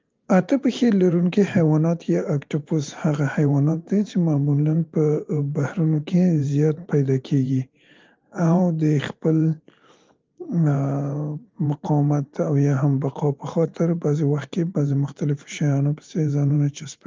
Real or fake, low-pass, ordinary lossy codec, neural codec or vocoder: fake; 7.2 kHz; Opus, 32 kbps; vocoder, 44.1 kHz, 128 mel bands every 512 samples, BigVGAN v2